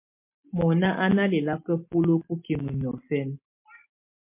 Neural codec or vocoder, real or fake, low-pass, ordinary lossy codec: none; real; 3.6 kHz; MP3, 24 kbps